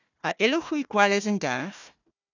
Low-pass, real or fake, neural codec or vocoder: 7.2 kHz; fake; codec, 16 kHz, 1 kbps, FunCodec, trained on Chinese and English, 50 frames a second